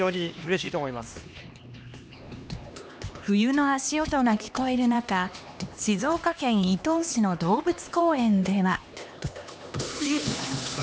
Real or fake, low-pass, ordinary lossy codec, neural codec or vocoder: fake; none; none; codec, 16 kHz, 2 kbps, X-Codec, HuBERT features, trained on LibriSpeech